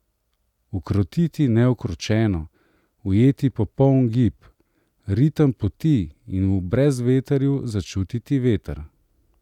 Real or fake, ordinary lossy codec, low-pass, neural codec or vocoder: real; none; 19.8 kHz; none